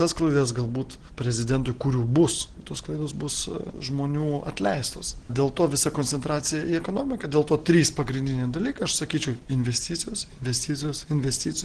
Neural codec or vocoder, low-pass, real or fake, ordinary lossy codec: none; 9.9 kHz; real; Opus, 16 kbps